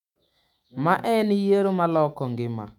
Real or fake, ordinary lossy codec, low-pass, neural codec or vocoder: fake; none; 19.8 kHz; autoencoder, 48 kHz, 128 numbers a frame, DAC-VAE, trained on Japanese speech